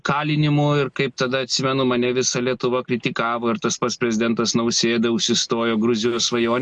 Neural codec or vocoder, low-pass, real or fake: none; 10.8 kHz; real